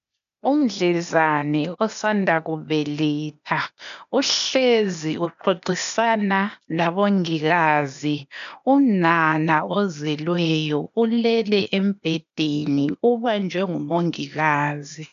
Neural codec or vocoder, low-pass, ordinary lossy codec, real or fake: codec, 16 kHz, 0.8 kbps, ZipCodec; 7.2 kHz; AAC, 96 kbps; fake